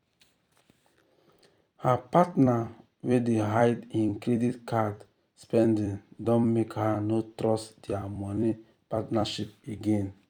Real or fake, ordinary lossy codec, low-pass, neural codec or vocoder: fake; none; 19.8 kHz; vocoder, 44.1 kHz, 128 mel bands every 256 samples, BigVGAN v2